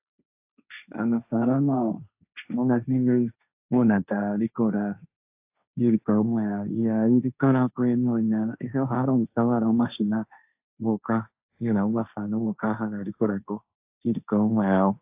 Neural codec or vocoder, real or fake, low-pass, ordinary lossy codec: codec, 16 kHz, 1.1 kbps, Voila-Tokenizer; fake; 3.6 kHz; AAC, 32 kbps